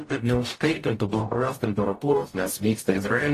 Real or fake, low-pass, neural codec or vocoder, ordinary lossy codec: fake; 14.4 kHz; codec, 44.1 kHz, 0.9 kbps, DAC; AAC, 48 kbps